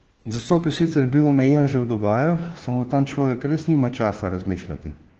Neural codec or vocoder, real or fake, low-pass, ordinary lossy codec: codec, 16 kHz, 1 kbps, FunCodec, trained on LibriTTS, 50 frames a second; fake; 7.2 kHz; Opus, 16 kbps